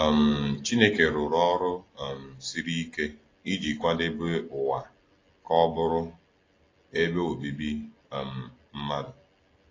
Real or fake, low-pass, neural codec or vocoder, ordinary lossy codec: real; 7.2 kHz; none; AAC, 32 kbps